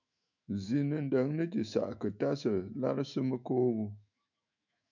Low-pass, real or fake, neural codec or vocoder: 7.2 kHz; fake; autoencoder, 48 kHz, 128 numbers a frame, DAC-VAE, trained on Japanese speech